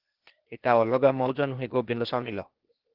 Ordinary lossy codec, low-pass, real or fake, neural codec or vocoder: Opus, 16 kbps; 5.4 kHz; fake; codec, 16 kHz, 0.8 kbps, ZipCodec